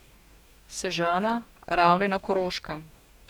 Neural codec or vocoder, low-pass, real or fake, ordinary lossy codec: codec, 44.1 kHz, 2.6 kbps, DAC; 19.8 kHz; fake; none